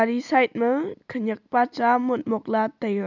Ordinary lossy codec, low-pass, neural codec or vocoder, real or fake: none; 7.2 kHz; none; real